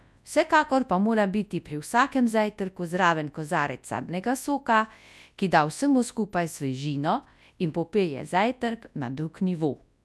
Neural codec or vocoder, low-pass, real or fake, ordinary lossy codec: codec, 24 kHz, 0.9 kbps, WavTokenizer, large speech release; none; fake; none